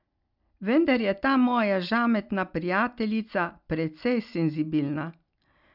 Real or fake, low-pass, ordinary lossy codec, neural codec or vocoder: real; 5.4 kHz; none; none